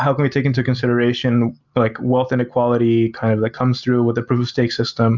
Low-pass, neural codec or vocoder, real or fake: 7.2 kHz; none; real